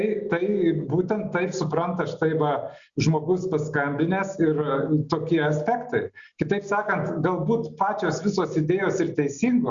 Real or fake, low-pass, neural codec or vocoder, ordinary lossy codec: real; 7.2 kHz; none; Opus, 64 kbps